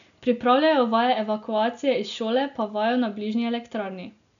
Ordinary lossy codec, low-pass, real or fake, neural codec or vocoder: none; 7.2 kHz; real; none